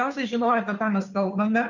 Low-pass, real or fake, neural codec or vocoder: 7.2 kHz; fake; codec, 16 kHz, 2 kbps, FunCodec, trained on Chinese and English, 25 frames a second